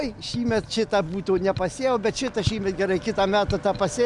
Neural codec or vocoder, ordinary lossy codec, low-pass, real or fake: none; Opus, 64 kbps; 10.8 kHz; real